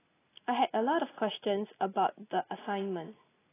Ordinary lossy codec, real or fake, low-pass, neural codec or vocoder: AAC, 16 kbps; real; 3.6 kHz; none